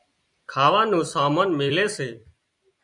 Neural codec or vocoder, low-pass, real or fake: vocoder, 44.1 kHz, 128 mel bands every 256 samples, BigVGAN v2; 10.8 kHz; fake